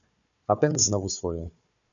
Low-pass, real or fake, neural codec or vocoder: 7.2 kHz; fake; codec, 16 kHz, 4 kbps, FunCodec, trained on Chinese and English, 50 frames a second